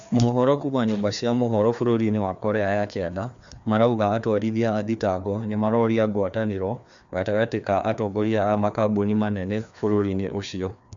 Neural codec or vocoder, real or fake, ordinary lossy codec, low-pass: codec, 16 kHz, 2 kbps, FreqCodec, larger model; fake; MP3, 64 kbps; 7.2 kHz